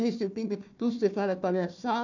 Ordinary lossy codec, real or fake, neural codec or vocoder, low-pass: none; fake; codec, 16 kHz, 1 kbps, FunCodec, trained on Chinese and English, 50 frames a second; 7.2 kHz